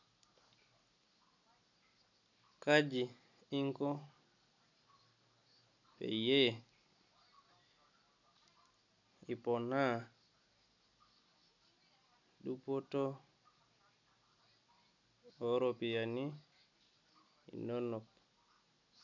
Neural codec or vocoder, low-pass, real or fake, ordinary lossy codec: none; 7.2 kHz; real; none